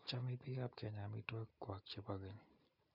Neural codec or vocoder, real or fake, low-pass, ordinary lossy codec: none; real; 5.4 kHz; none